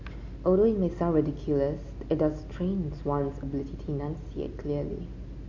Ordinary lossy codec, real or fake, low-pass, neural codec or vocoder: AAC, 48 kbps; real; 7.2 kHz; none